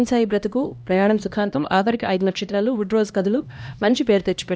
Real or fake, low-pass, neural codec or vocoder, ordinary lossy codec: fake; none; codec, 16 kHz, 1 kbps, X-Codec, HuBERT features, trained on LibriSpeech; none